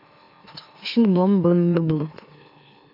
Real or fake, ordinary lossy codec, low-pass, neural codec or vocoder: fake; AAC, 32 kbps; 5.4 kHz; autoencoder, 44.1 kHz, a latent of 192 numbers a frame, MeloTTS